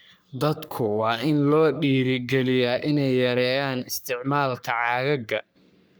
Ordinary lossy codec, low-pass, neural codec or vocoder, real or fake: none; none; codec, 44.1 kHz, 3.4 kbps, Pupu-Codec; fake